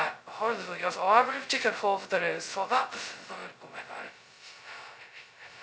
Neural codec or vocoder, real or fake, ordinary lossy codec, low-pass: codec, 16 kHz, 0.2 kbps, FocalCodec; fake; none; none